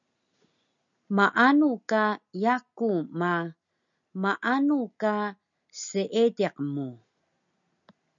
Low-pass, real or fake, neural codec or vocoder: 7.2 kHz; real; none